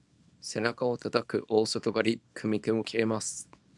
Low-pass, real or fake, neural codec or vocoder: 10.8 kHz; fake; codec, 24 kHz, 0.9 kbps, WavTokenizer, small release